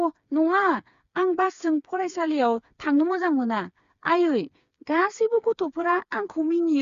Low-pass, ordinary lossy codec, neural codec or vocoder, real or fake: 7.2 kHz; Opus, 64 kbps; codec, 16 kHz, 4 kbps, FreqCodec, smaller model; fake